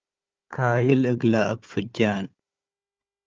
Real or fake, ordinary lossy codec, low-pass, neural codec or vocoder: fake; Opus, 24 kbps; 7.2 kHz; codec, 16 kHz, 4 kbps, FunCodec, trained on Chinese and English, 50 frames a second